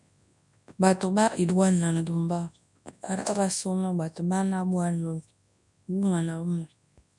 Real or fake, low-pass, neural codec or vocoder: fake; 10.8 kHz; codec, 24 kHz, 0.9 kbps, WavTokenizer, large speech release